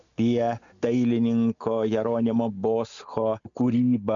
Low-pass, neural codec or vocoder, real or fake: 7.2 kHz; none; real